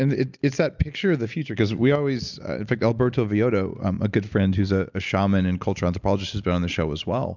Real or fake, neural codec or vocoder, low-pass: real; none; 7.2 kHz